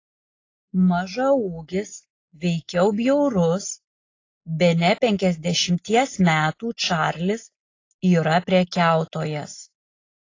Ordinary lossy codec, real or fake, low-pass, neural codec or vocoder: AAC, 32 kbps; real; 7.2 kHz; none